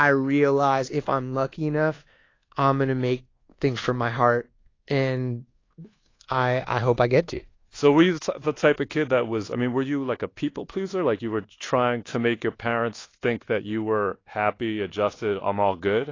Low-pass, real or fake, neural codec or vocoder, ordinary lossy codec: 7.2 kHz; fake; codec, 24 kHz, 1.2 kbps, DualCodec; AAC, 32 kbps